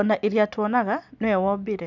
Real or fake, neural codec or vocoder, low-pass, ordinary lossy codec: real; none; 7.2 kHz; none